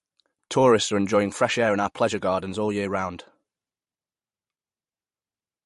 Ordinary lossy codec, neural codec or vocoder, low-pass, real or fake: MP3, 48 kbps; vocoder, 44.1 kHz, 128 mel bands every 512 samples, BigVGAN v2; 14.4 kHz; fake